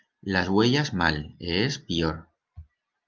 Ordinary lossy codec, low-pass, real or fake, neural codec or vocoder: Opus, 24 kbps; 7.2 kHz; real; none